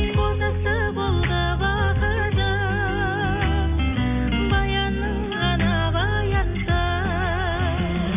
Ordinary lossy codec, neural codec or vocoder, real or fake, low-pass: none; none; real; 3.6 kHz